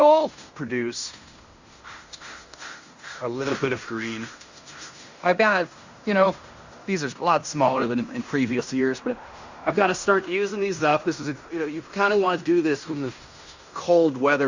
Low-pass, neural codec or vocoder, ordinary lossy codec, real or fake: 7.2 kHz; codec, 16 kHz in and 24 kHz out, 0.9 kbps, LongCat-Audio-Codec, fine tuned four codebook decoder; Opus, 64 kbps; fake